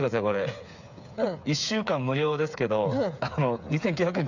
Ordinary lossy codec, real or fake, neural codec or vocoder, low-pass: none; fake; codec, 16 kHz, 8 kbps, FreqCodec, smaller model; 7.2 kHz